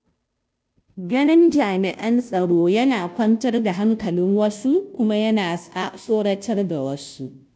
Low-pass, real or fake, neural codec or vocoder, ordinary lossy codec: none; fake; codec, 16 kHz, 0.5 kbps, FunCodec, trained on Chinese and English, 25 frames a second; none